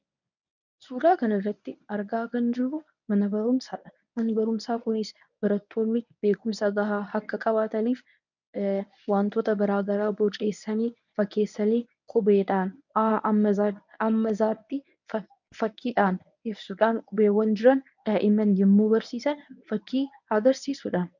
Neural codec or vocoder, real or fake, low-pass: codec, 24 kHz, 0.9 kbps, WavTokenizer, medium speech release version 1; fake; 7.2 kHz